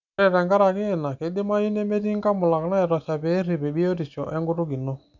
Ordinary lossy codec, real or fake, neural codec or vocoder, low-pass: none; real; none; 7.2 kHz